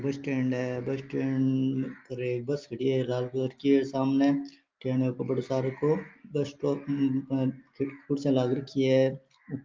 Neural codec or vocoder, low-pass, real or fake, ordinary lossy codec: none; 7.2 kHz; real; Opus, 32 kbps